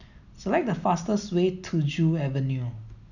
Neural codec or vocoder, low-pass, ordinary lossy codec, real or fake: none; 7.2 kHz; none; real